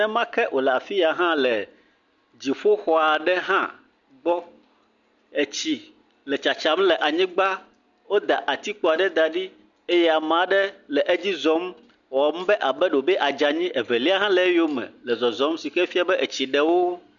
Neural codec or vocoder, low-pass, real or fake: none; 7.2 kHz; real